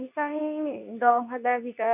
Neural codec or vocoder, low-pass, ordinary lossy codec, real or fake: codec, 24 kHz, 0.9 kbps, WavTokenizer, medium speech release version 2; 3.6 kHz; none; fake